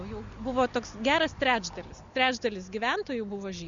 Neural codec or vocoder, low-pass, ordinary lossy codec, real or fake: none; 7.2 kHz; Opus, 64 kbps; real